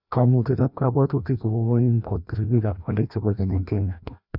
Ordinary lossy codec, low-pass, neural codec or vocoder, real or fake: none; 5.4 kHz; codec, 16 kHz, 1 kbps, FreqCodec, larger model; fake